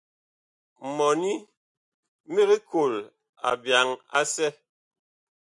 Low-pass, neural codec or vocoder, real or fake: 10.8 kHz; none; real